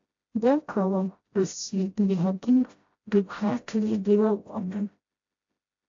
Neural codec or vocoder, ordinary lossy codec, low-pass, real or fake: codec, 16 kHz, 0.5 kbps, FreqCodec, smaller model; AAC, 48 kbps; 7.2 kHz; fake